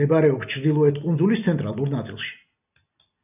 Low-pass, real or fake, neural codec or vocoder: 3.6 kHz; real; none